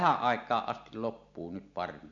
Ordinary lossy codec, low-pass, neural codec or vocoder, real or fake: none; 7.2 kHz; none; real